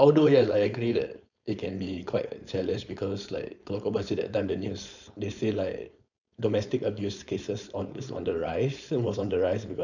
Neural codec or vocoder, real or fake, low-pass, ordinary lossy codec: codec, 16 kHz, 4.8 kbps, FACodec; fake; 7.2 kHz; none